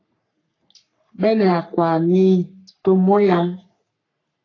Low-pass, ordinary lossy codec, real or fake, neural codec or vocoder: 7.2 kHz; AAC, 32 kbps; fake; codec, 44.1 kHz, 3.4 kbps, Pupu-Codec